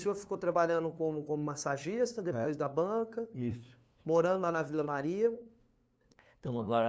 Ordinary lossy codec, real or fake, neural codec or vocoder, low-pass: none; fake; codec, 16 kHz, 2 kbps, FunCodec, trained on LibriTTS, 25 frames a second; none